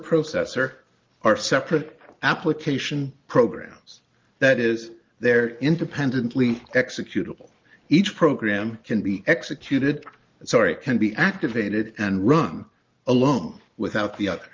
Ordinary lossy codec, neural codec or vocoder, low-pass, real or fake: Opus, 32 kbps; none; 7.2 kHz; real